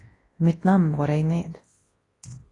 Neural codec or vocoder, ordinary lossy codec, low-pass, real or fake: codec, 24 kHz, 0.9 kbps, WavTokenizer, large speech release; AAC, 32 kbps; 10.8 kHz; fake